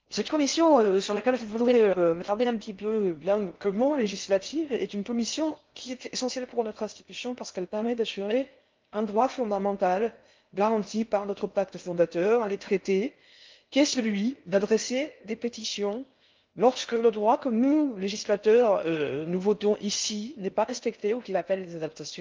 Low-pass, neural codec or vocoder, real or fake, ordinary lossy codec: 7.2 kHz; codec, 16 kHz in and 24 kHz out, 0.6 kbps, FocalCodec, streaming, 4096 codes; fake; Opus, 24 kbps